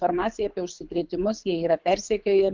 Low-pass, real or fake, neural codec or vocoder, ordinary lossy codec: 7.2 kHz; fake; codec, 24 kHz, 6 kbps, HILCodec; Opus, 32 kbps